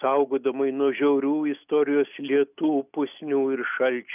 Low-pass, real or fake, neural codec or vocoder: 3.6 kHz; real; none